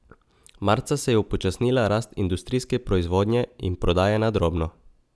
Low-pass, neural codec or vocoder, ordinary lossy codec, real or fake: none; none; none; real